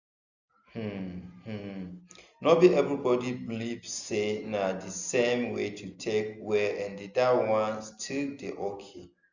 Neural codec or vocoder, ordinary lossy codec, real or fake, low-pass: none; none; real; 7.2 kHz